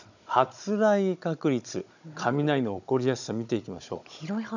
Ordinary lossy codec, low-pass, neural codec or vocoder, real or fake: none; 7.2 kHz; codec, 16 kHz, 16 kbps, FunCodec, trained on Chinese and English, 50 frames a second; fake